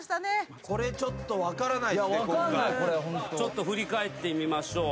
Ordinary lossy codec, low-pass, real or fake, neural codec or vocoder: none; none; real; none